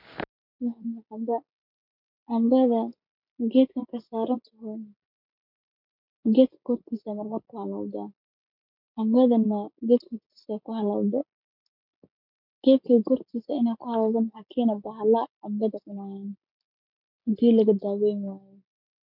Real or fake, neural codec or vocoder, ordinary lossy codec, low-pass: real; none; none; 5.4 kHz